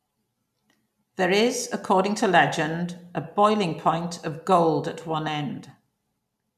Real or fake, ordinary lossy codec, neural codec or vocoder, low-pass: real; none; none; 14.4 kHz